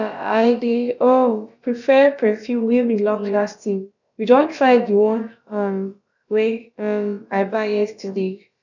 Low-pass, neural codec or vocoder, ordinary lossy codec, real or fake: 7.2 kHz; codec, 16 kHz, about 1 kbps, DyCAST, with the encoder's durations; none; fake